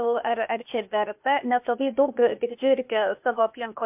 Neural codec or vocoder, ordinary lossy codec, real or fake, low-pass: codec, 16 kHz, 0.8 kbps, ZipCodec; MP3, 32 kbps; fake; 3.6 kHz